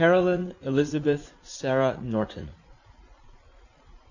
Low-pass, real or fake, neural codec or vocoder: 7.2 kHz; fake; vocoder, 44.1 kHz, 80 mel bands, Vocos